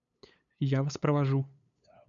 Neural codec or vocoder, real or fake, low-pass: codec, 16 kHz, 8 kbps, FunCodec, trained on LibriTTS, 25 frames a second; fake; 7.2 kHz